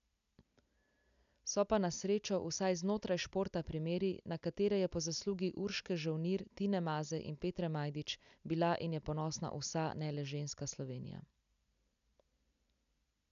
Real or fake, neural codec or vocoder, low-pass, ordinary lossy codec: real; none; 7.2 kHz; none